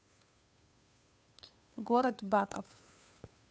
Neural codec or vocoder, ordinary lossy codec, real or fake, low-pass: codec, 16 kHz, 2 kbps, FunCodec, trained on Chinese and English, 25 frames a second; none; fake; none